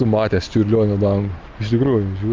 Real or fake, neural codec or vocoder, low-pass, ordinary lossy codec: real; none; 7.2 kHz; Opus, 32 kbps